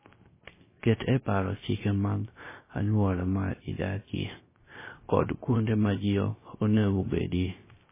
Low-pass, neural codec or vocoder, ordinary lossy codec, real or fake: 3.6 kHz; codec, 16 kHz, 0.3 kbps, FocalCodec; MP3, 16 kbps; fake